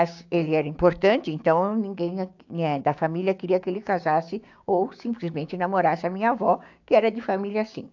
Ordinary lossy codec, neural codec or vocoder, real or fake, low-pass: AAC, 48 kbps; codec, 16 kHz, 6 kbps, DAC; fake; 7.2 kHz